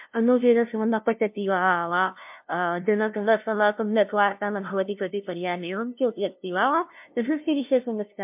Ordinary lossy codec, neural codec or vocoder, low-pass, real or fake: MP3, 32 kbps; codec, 16 kHz, 0.5 kbps, FunCodec, trained on LibriTTS, 25 frames a second; 3.6 kHz; fake